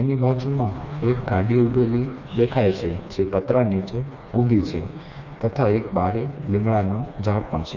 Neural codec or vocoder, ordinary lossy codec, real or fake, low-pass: codec, 16 kHz, 2 kbps, FreqCodec, smaller model; none; fake; 7.2 kHz